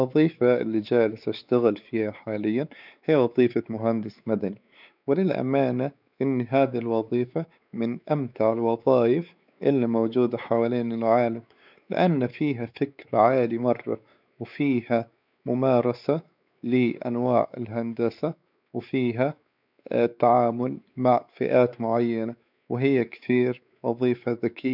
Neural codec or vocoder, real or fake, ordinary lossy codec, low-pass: codec, 16 kHz, 4 kbps, X-Codec, WavLM features, trained on Multilingual LibriSpeech; fake; none; 5.4 kHz